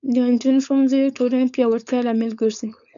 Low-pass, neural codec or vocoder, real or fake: 7.2 kHz; codec, 16 kHz, 4.8 kbps, FACodec; fake